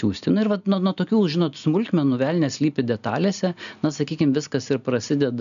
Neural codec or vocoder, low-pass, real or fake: none; 7.2 kHz; real